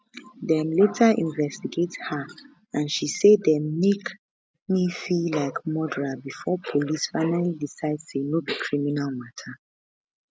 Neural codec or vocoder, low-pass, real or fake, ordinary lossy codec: none; none; real; none